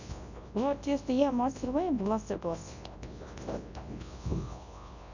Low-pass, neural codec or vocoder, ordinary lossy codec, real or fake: 7.2 kHz; codec, 24 kHz, 0.9 kbps, WavTokenizer, large speech release; none; fake